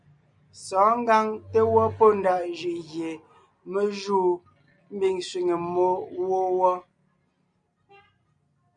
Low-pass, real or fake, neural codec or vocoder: 9.9 kHz; real; none